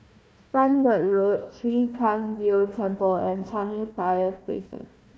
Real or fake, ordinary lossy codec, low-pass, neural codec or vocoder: fake; none; none; codec, 16 kHz, 1 kbps, FunCodec, trained on Chinese and English, 50 frames a second